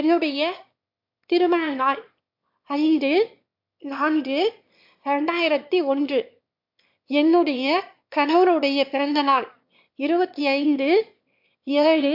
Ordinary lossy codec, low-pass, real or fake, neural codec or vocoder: MP3, 48 kbps; 5.4 kHz; fake; autoencoder, 22.05 kHz, a latent of 192 numbers a frame, VITS, trained on one speaker